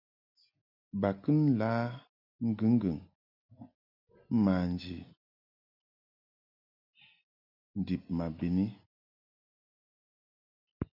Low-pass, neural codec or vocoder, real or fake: 5.4 kHz; none; real